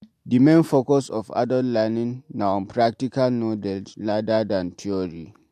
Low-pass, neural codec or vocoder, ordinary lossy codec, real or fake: 14.4 kHz; none; MP3, 64 kbps; real